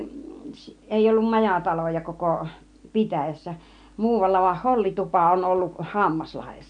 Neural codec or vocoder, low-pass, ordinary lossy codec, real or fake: none; 9.9 kHz; none; real